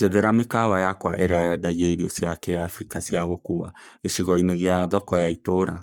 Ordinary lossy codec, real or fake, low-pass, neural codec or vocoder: none; fake; none; codec, 44.1 kHz, 3.4 kbps, Pupu-Codec